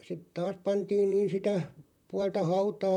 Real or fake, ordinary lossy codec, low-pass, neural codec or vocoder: fake; none; 19.8 kHz; vocoder, 44.1 kHz, 128 mel bands, Pupu-Vocoder